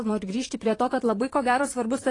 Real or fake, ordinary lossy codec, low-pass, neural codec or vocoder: fake; AAC, 32 kbps; 10.8 kHz; vocoder, 44.1 kHz, 128 mel bands, Pupu-Vocoder